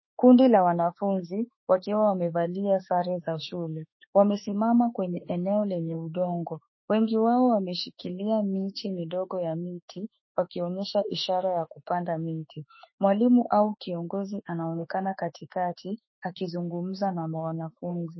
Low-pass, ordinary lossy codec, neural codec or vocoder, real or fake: 7.2 kHz; MP3, 24 kbps; autoencoder, 48 kHz, 32 numbers a frame, DAC-VAE, trained on Japanese speech; fake